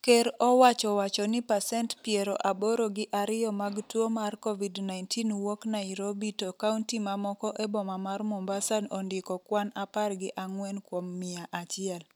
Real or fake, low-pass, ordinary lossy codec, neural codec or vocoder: real; none; none; none